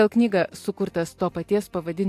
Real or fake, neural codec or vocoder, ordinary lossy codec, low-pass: real; none; AAC, 64 kbps; 14.4 kHz